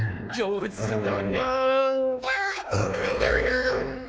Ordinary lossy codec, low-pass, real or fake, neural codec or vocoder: none; none; fake; codec, 16 kHz, 2 kbps, X-Codec, WavLM features, trained on Multilingual LibriSpeech